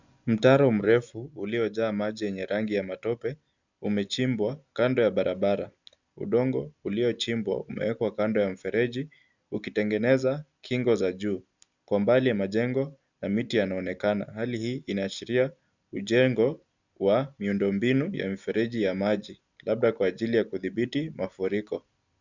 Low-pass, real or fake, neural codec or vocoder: 7.2 kHz; real; none